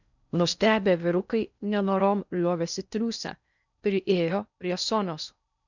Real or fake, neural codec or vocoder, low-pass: fake; codec, 16 kHz in and 24 kHz out, 0.6 kbps, FocalCodec, streaming, 4096 codes; 7.2 kHz